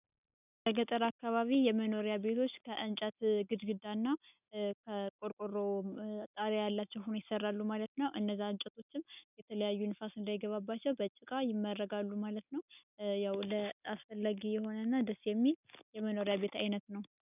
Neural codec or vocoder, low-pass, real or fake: none; 3.6 kHz; real